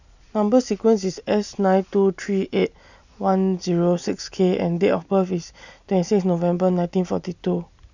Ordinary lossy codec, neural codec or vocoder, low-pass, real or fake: none; none; 7.2 kHz; real